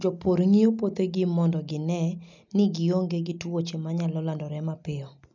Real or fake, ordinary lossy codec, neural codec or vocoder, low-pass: real; none; none; 7.2 kHz